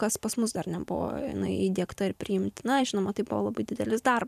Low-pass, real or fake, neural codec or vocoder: 14.4 kHz; fake; vocoder, 44.1 kHz, 128 mel bands every 512 samples, BigVGAN v2